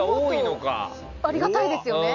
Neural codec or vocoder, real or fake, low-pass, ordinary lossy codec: none; real; 7.2 kHz; none